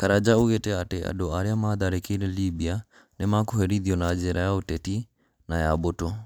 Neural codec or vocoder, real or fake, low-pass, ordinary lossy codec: none; real; none; none